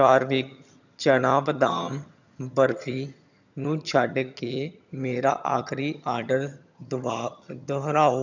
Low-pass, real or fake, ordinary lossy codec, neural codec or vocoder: 7.2 kHz; fake; none; vocoder, 22.05 kHz, 80 mel bands, HiFi-GAN